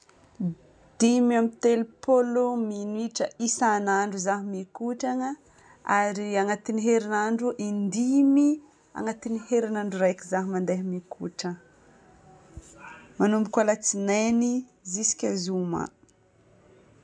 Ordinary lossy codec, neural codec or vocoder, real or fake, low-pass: none; none; real; 9.9 kHz